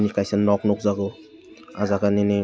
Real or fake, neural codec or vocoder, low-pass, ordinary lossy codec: real; none; none; none